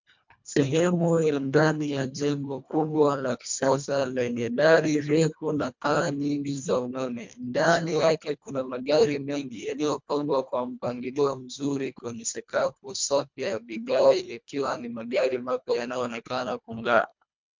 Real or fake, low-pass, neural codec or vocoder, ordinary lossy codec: fake; 7.2 kHz; codec, 24 kHz, 1.5 kbps, HILCodec; MP3, 64 kbps